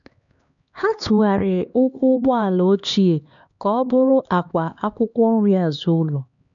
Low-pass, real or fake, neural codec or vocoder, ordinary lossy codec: 7.2 kHz; fake; codec, 16 kHz, 2 kbps, X-Codec, HuBERT features, trained on LibriSpeech; none